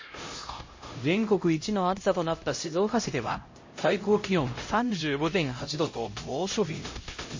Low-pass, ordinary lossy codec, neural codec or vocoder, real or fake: 7.2 kHz; MP3, 32 kbps; codec, 16 kHz, 0.5 kbps, X-Codec, HuBERT features, trained on LibriSpeech; fake